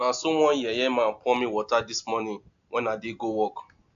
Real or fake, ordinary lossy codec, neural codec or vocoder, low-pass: real; AAC, 64 kbps; none; 7.2 kHz